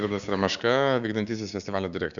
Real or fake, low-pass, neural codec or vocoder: fake; 7.2 kHz; codec, 16 kHz, 6 kbps, DAC